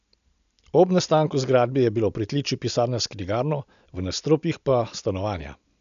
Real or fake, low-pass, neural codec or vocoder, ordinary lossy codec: real; 7.2 kHz; none; none